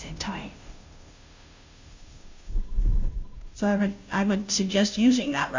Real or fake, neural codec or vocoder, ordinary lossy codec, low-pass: fake; codec, 16 kHz, 0.5 kbps, FunCodec, trained on Chinese and English, 25 frames a second; MP3, 64 kbps; 7.2 kHz